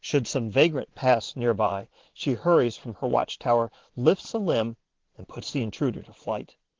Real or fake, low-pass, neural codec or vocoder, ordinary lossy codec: fake; 7.2 kHz; vocoder, 22.05 kHz, 80 mel bands, Vocos; Opus, 16 kbps